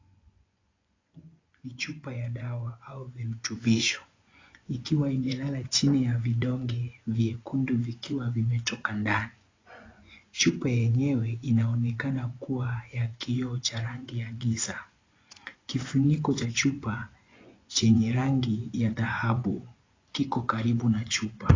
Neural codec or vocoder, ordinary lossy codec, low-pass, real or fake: vocoder, 24 kHz, 100 mel bands, Vocos; AAC, 32 kbps; 7.2 kHz; fake